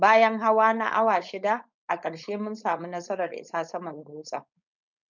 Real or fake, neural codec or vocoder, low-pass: fake; codec, 16 kHz, 4.8 kbps, FACodec; 7.2 kHz